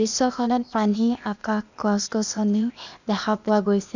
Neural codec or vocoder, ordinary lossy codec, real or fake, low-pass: codec, 16 kHz, 0.8 kbps, ZipCodec; none; fake; 7.2 kHz